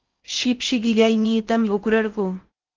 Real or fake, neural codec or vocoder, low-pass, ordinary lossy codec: fake; codec, 16 kHz in and 24 kHz out, 0.6 kbps, FocalCodec, streaming, 4096 codes; 7.2 kHz; Opus, 24 kbps